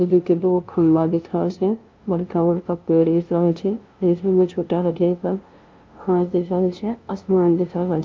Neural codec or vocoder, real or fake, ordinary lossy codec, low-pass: codec, 16 kHz, 0.5 kbps, FunCodec, trained on LibriTTS, 25 frames a second; fake; Opus, 32 kbps; 7.2 kHz